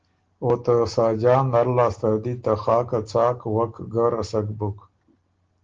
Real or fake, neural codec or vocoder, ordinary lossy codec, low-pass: real; none; Opus, 16 kbps; 7.2 kHz